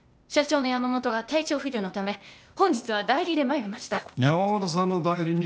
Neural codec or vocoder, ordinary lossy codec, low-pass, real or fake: codec, 16 kHz, 0.8 kbps, ZipCodec; none; none; fake